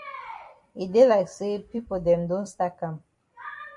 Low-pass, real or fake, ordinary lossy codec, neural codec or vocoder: 10.8 kHz; fake; AAC, 64 kbps; vocoder, 44.1 kHz, 128 mel bands every 256 samples, BigVGAN v2